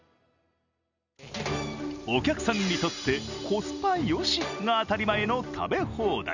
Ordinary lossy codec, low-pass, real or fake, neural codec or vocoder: none; 7.2 kHz; real; none